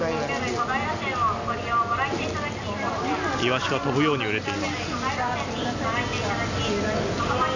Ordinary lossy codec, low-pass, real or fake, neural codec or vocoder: none; 7.2 kHz; real; none